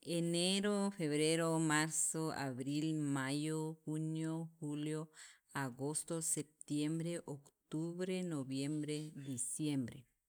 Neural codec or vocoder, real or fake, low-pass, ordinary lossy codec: none; real; none; none